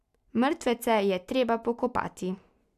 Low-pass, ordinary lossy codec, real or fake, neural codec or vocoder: 14.4 kHz; none; real; none